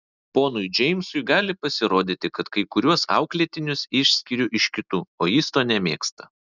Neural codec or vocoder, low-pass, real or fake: none; 7.2 kHz; real